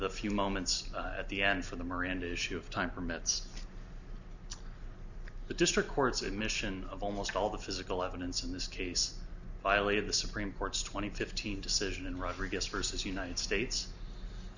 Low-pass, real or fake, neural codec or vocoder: 7.2 kHz; real; none